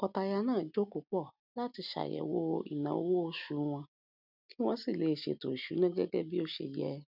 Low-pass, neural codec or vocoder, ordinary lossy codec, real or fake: 5.4 kHz; none; none; real